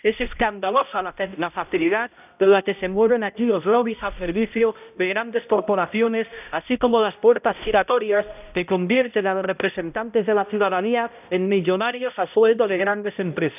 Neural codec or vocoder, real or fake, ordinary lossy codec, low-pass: codec, 16 kHz, 0.5 kbps, X-Codec, HuBERT features, trained on balanced general audio; fake; none; 3.6 kHz